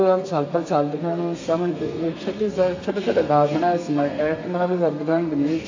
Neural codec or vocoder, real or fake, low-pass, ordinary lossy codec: codec, 32 kHz, 1.9 kbps, SNAC; fake; 7.2 kHz; AAC, 48 kbps